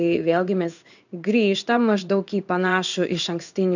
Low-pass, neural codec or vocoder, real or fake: 7.2 kHz; codec, 16 kHz in and 24 kHz out, 1 kbps, XY-Tokenizer; fake